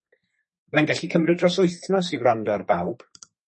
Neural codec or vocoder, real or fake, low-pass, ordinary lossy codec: codec, 32 kHz, 1.9 kbps, SNAC; fake; 10.8 kHz; MP3, 32 kbps